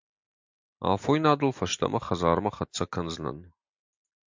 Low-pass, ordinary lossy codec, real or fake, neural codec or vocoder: 7.2 kHz; MP3, 64 kbps; real; none